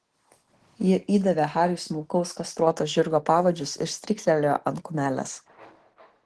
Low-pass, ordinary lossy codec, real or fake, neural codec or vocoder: 10.8 kHz; Opus, 16 kbps; real; none